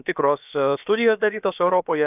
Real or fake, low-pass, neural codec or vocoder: fake; 3.6 kHz; codec, 16 kHz, about 1 kbps, DyCAST, with the encoder's durations